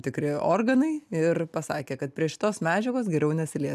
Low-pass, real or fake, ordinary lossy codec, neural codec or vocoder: 14.4 kHz; real; MP3, 96 kbps; none